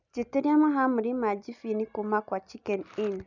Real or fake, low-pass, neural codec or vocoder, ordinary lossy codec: real; 7.2 kHz; none; none